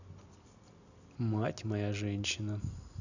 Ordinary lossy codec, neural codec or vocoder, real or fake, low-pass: none; none; real; 7.2 kHz